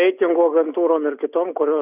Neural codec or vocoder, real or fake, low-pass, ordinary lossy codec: none; real; 3.6 kHz; Opus, 64 kbps